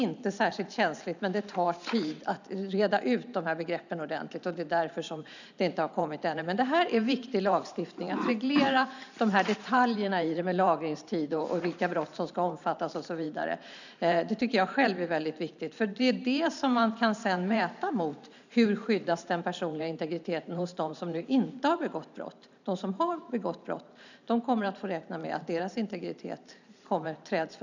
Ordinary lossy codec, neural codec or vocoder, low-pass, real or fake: none; vocoder, 44.1 kHz, 128 mel bands every 512 samples, BigVGAN v2; 7.2 kHz; fake